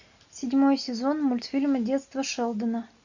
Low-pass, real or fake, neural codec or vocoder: 7.2 kHz; real; none